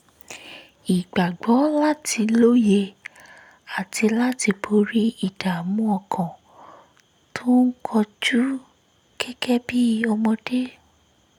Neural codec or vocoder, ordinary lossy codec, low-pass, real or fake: none; none; 19.8 kHz; real